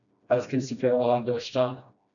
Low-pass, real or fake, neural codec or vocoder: 7.2 kHz; fake; codec, 16 kHz, 1 kbps, FreqCodec, smaller model